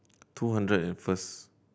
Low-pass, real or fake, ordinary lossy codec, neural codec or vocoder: none; real; none; none